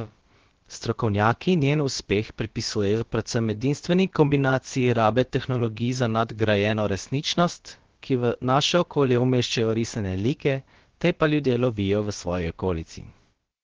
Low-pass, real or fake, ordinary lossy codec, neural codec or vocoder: 7.2 kHz; fake; Opus, 16 kbps; codec, 16 kHz, about 1 kbps, DyCAST, with the encoder's durations